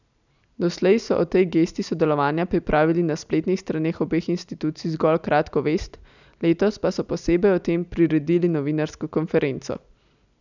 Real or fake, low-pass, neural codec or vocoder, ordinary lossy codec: real; 7.2 kHz; none; none